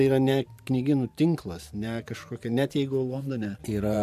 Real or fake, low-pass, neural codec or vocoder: fake; 14.4 kHz; vocoder, 44.1 kHz, 128 mel bands every 512 samples, BigVGAN v2